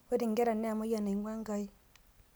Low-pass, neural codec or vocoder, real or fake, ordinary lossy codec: none; none; real; none